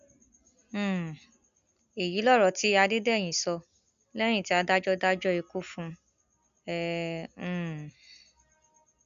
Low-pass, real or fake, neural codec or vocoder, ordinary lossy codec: 7.2 kHz; real; none; none